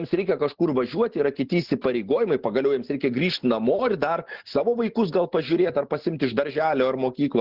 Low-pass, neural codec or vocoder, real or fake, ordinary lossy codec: 5.4 kHz; none; real; Opus, 16 kbps